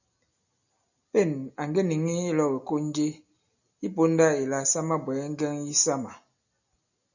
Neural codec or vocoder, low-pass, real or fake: none; 7.2 kHz; real